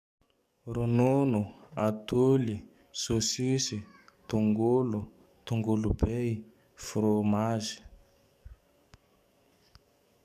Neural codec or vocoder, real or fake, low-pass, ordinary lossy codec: codec, 44.1 kHz, 7.8 kbps, DAC; fake; 14.4 kHz; none